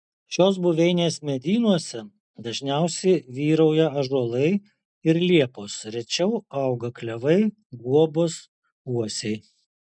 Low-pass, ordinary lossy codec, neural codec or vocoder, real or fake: 9.9 kHz; MP3, 96 kbps; none; real